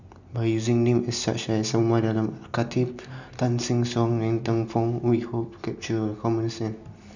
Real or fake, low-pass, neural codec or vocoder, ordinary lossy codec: real; 7.2 kHz; none; none